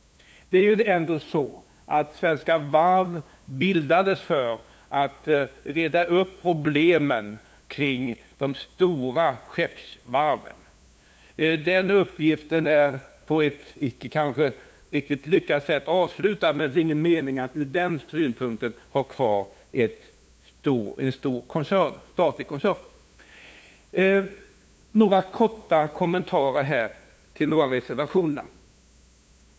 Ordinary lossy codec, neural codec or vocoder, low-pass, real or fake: none; codec, 16 kHz, 2 kbps, FunCodec, trained on LibriTTS, 25 frames a second; none; fake